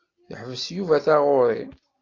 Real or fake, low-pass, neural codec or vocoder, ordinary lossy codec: real; 7.2 kHz; none; AAC, 32 kbps